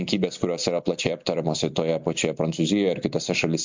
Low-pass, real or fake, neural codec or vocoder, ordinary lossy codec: 7.2 kHz; real; none; MP3, 64 kbps